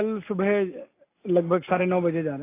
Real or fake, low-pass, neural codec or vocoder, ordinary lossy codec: real; 3.6 kHz; none; AAC, 24 kbps